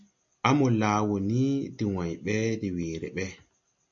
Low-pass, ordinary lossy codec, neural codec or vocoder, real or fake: 7.2 kHz; AAC, 64 kbps; none; real